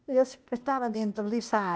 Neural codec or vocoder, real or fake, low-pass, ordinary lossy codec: codec, 16 kHz, 0.8 kbps, ZipCodec; fake; none; none